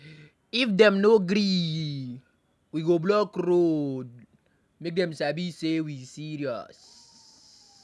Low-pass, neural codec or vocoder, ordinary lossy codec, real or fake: none; none; none; real